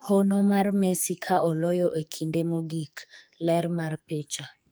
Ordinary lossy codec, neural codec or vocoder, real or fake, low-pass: none; codec, 44.1 kHz, 2.6 kbps, SNAC; fake; none